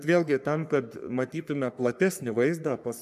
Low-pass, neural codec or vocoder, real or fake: 14.4 kHz; codec, 44.1 kHz, 3.4 kbps, Pupu-Codec; fake